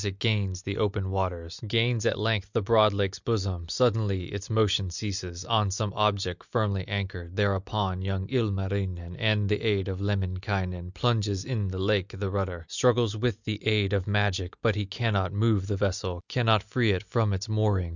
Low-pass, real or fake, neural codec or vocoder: 7.2 kHz; real; none